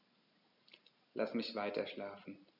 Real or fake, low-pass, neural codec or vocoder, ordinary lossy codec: real; 5.4 kHz; none; none